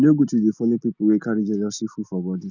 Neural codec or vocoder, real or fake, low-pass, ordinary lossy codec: none; real; 7.2 kHz; none